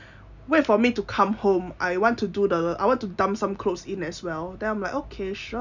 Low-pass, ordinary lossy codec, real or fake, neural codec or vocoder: 7.2 kHz; none; real; none